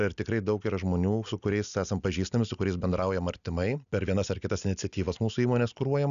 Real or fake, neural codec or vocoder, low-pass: real; none; 7.2 kHz